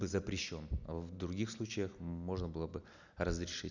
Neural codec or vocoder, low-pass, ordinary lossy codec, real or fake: none; 7.2 kHz; none; real